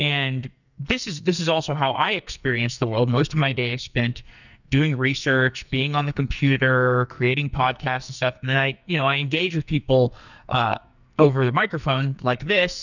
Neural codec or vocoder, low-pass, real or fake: codec, 44.1 kHz, 2.6 kbps, SNAC; 7.2 kHz; fake